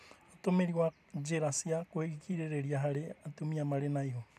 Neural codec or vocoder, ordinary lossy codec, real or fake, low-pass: vocoder, 44.1 kHz, 128 mel bands every 256 samples, BigVGAN v2; none; fake; 14.4 kHz